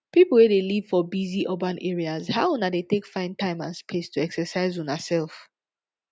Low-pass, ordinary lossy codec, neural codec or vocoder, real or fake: none; none; none; real